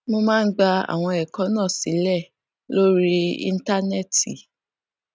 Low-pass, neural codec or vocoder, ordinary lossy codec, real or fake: none; none; none; real